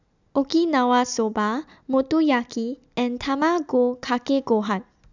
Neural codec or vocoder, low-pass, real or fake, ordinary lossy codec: none; 7.2 kHz; real; none